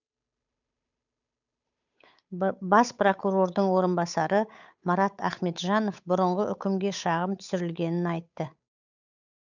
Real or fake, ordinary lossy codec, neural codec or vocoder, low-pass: fake; none; codec, 16 kHz, 8 kbps, FunCodec, trained on Chinese and English, 25 frames a second; 7.2 kHz